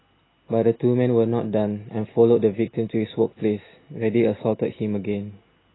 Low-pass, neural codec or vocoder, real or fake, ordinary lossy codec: 7.2 kHz; none; real; AAC, 16 kbps